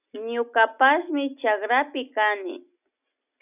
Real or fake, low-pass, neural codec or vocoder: real; 3.6 kHz; none